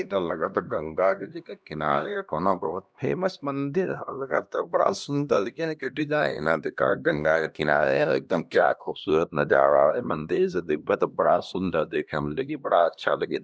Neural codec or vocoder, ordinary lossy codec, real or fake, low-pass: codec, 16 kHz, 1 kbps, X-Codec, HuBERT features, trained on LibriSpeech; none; fake; none